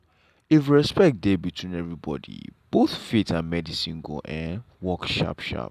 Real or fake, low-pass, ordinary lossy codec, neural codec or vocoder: real; 14.4 kHz; none; none